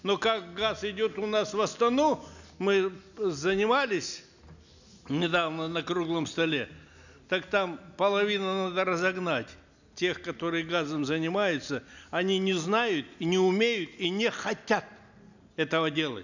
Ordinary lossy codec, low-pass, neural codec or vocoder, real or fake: none; 7.2 kHz; none; real